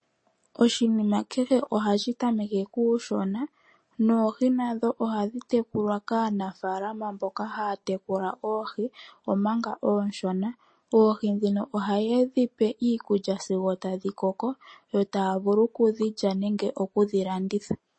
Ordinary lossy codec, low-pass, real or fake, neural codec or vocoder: MP3, 32 kbps; 9.9 kHz; real; none